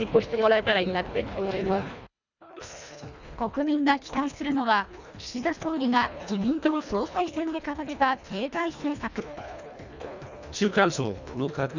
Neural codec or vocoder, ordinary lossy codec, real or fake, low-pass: codec, 24 kHz, 1.5 kbps, HILCodec; none; fake; 7.2 kHz